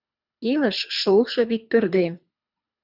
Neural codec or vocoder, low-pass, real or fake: codec, 24 kHz, 3 kbps, HILCodec; 5.4 kHz; fake